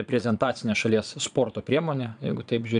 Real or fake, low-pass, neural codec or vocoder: fake; 9.9 kHz; vocoder, 22.05 kHz, 80 mel bands, Vocos